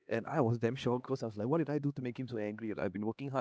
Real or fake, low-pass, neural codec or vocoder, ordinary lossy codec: fake; none; codec, 16 kHz, 2 kbps, X-Codec, HuBERT features, trained on LibriSpeech; none